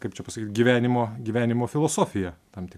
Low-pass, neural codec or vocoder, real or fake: 14.4 kHz; none; real